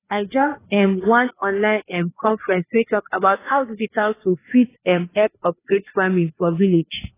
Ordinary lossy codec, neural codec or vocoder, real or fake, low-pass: AAC, 16 kbps; codec, 16 kHz, 2 kbps, FreqCodec, larger model; fake; 3.6 kHz